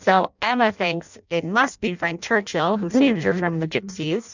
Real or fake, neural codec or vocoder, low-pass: fake; codec, 16 kHz in and 24 kHz out, 0.6 kbps, FireRedTTS-2 codec; 7.2 kHz